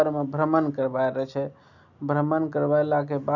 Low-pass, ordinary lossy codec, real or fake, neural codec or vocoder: 7.2 kHz; none; real; none